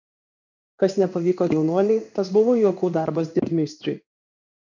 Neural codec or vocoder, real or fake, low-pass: codec, 16 kHz in and 24 kHz out, 1 kbps, XY-Tokenizer; fake; 7.2 kHz